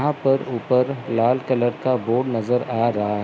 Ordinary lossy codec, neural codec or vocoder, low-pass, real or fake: none; none; none; real